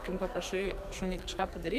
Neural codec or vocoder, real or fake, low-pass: codec, 44.1 kHz, 2.6 kbps, SNAC; fake; 14.4 kHz